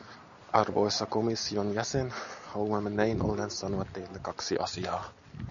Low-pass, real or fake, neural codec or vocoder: 7.2 kHz; real; none